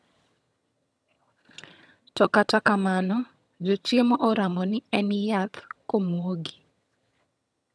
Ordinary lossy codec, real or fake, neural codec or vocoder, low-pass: none; fake; vocoder, 22.05 kHz, 80 mel bands, HiFi-GAN; none